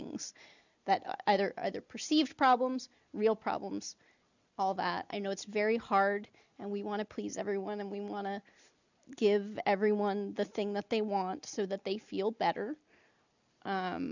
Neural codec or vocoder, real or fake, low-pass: none; real; 7.2 kHz